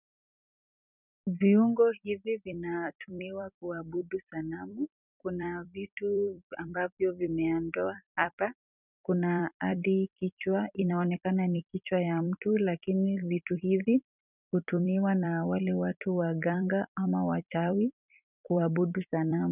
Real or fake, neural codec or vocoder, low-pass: fake; vocoder, 44.1 kHz, 128 mel bands every 256 samples, BigVGAN v2; 3.6 kHz